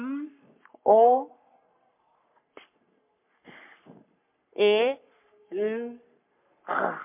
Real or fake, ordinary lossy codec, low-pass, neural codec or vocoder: fake; MP3, 32 kbps; 3.6 kHz; codec, 44.1 kHz, 3.4 kbps, Pupu-Codec